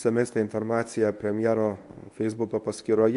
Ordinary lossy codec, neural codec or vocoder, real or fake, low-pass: AAC, 64 kbps; codec, 24 kHz, 0.9 kbps, WavTokenizer, medium speech release version 1; fake; 10.8 kHz